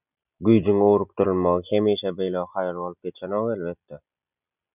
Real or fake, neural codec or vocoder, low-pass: real; none; 3.6 kHz